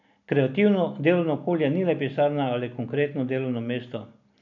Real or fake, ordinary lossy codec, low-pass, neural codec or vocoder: real; none; 7.2 kHz; none